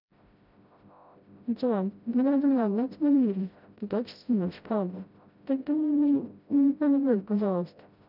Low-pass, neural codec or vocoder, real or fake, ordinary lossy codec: 5.4 kHz; codec, 16 kHz, 0.5 kbps, FreqCodec, smaller model; fake; none